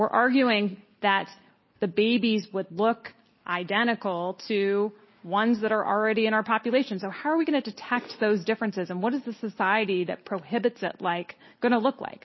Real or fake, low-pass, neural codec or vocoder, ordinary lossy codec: real; 7.2 kHz; none; MP3, 24 kbps